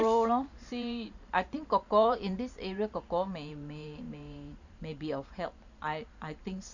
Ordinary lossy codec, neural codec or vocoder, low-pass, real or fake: none; vocoder, 44.1 kHz, 128 mel bands every 512 samples, BigVGAN v2; 7.2 kHz; fake